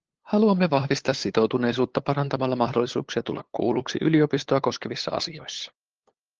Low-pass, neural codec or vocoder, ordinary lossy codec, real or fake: 7.2 kHz; codec, 16 kHz, 8 kbps, FunCodec, trained on LibriTTS, 25 frames a second; Opus, 16 kbps; fake